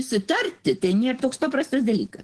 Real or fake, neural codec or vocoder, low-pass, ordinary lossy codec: fake; codec, 44.1 kHz, 7.8 kbps, DAC; 10.8 kHz; Opus, 16 kbps